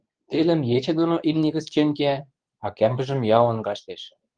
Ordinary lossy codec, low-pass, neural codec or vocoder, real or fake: Opus, 24 kbps; 9.9 kHz; codec, 24 kHz, 0.9 kbps, WavTokenizer, medium speech release version 2; fake